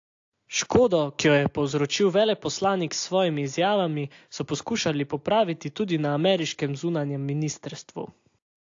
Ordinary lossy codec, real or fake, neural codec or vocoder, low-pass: AAC, 48 kbps; real; none; 7.2 kHz